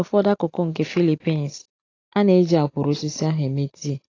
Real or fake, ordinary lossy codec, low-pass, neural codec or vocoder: real; AAC, 32 kbps; 7.2 kHz; none